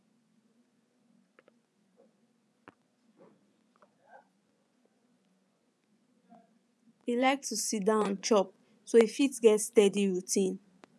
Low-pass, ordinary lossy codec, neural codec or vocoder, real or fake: none; none; vocoder, 24 kHz, 100 mel bands, Vocos; fake